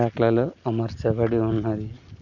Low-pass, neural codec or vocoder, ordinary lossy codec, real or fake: 7.2 kHz; none; none; real